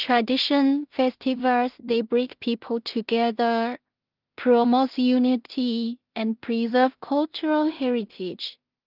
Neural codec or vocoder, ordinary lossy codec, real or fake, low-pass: codec, 16 kHz in and 24 kHz out, 0.4 kbps, LongCat-Audio-Codec, two codebook decoder; Opus, 32 kbps; fake; 5.4 kHz